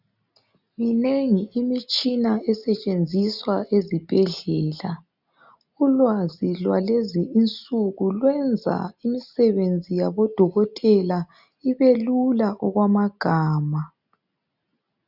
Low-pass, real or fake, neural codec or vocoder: 5.4 kHz; real; none